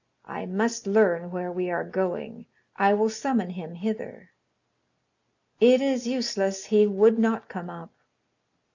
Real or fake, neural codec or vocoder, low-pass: real; none; 7.2 kHz